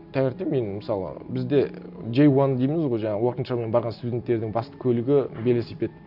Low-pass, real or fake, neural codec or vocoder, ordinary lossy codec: 5.4 kHz; real; none; none